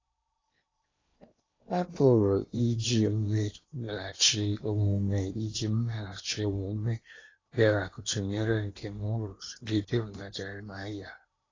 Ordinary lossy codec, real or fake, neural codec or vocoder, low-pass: AAC, 32 kbps; fake; codec, 16 kHz in and 24 kHz out, 0.8 kbps, FocalCodec, streaming, 65536 codes; 7.2 kHz